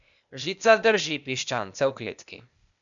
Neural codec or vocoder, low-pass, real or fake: codec, 16 kHz, 0.8 kbps, ZipCodec; 7.2 kHz; fake